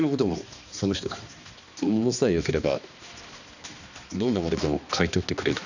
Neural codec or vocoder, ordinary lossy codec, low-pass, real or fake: codec, 16 kHz, 2 kbps, X-Codec, HuBERT features, trained on balanced general audio; none; 7.2 kHz; fake